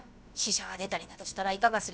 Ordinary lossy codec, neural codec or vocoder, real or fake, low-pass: none; codec, 16 kHz, about 1 kbps, DyCAST, with the encoder's durations; fake; none